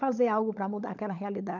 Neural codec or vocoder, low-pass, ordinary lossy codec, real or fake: codec, 16 kHz, 16 kbps, FunCodec, trained on LibriTTS, 50 frames a second; 7.2 kHz; none; fake